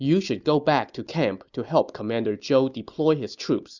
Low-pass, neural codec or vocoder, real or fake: 7.2 kHz; none; real